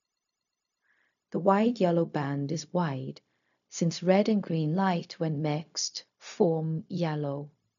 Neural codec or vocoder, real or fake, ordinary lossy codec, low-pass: codec, 16 kHz, 0.4 kbps, LongCat-Audio-Codec; fake; none; 7.2 kHz